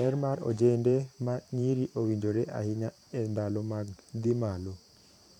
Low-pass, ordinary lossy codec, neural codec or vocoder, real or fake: 19.8 kHz; none; vocoder, 44.1 kHz, 128 mel bands every 512 samples, BigVGAN v2; fake